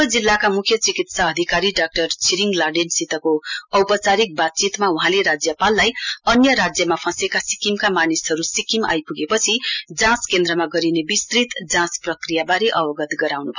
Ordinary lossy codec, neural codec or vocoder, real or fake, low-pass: none; none; real; 7.2 kHz